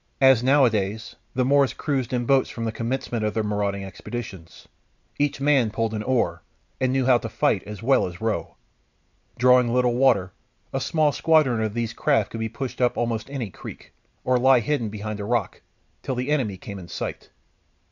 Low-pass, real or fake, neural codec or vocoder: 7.2 kHz; real; none